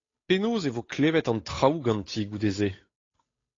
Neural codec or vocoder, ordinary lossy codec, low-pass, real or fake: codec, 16 kHz, 8 kbps, FunCodec, trained on Chinese and English, 25 frames a second; AAC, 32 kbps; 7.2 kHz; fake